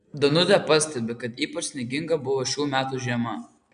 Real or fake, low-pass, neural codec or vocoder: real; 9.9 kHz; none